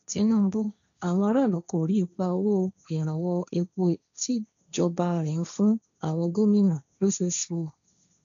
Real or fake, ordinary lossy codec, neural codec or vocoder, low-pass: fake; none; codec, 16 kHz, 1.1 kbps, Voila-Tokenizer; 7.2 kHz